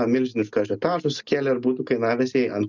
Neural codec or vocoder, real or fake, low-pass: none; real; 7.2 kHz